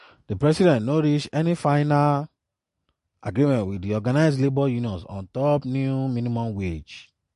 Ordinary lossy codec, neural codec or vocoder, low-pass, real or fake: MP3, 48 kbps; none; 14.4 kHz; real